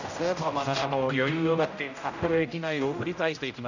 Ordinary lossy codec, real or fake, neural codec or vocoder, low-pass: none; fake; codec, 16 kHz, 0.5 kbps, X-Codec, HuBERT features, trained on general audio; 7.2 kHz